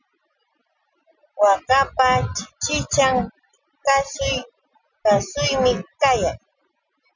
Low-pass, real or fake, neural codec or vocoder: 7.2 kHz; real; none